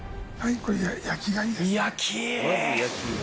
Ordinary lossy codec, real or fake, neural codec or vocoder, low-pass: none; real; none; none